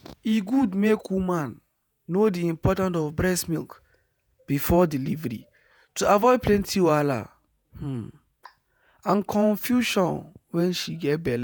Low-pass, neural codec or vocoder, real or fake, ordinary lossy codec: none; vocoder, 48 kHz, 128 mel bands, Vocos; fake; none